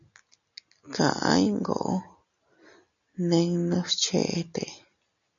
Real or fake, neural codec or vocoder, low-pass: real; none; 7.2 kHz